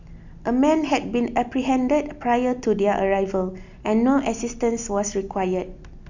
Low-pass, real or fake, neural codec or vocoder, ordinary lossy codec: 7.2 kHz; real; none; none